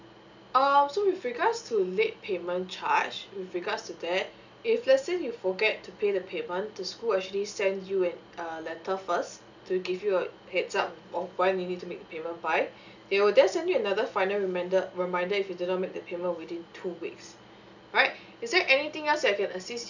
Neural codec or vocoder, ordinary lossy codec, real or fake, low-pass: none; none; real; 7.2 kHz